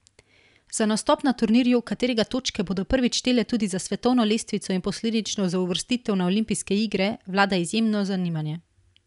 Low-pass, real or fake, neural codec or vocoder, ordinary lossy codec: 10.8 kHz; real; none; none